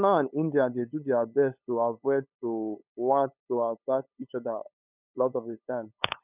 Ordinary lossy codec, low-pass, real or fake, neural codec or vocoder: none; 3.6 kHz; fake; codec, 16 kHz, 8 kbps, FunCodec, trained on LibriTTS, 25 frames a second